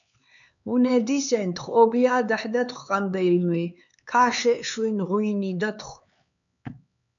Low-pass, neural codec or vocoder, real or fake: 7.2 kHz; codec, 16 kHz, 4 kbps, X-Codec, HuBERT features, trained on LibriSpeech; fake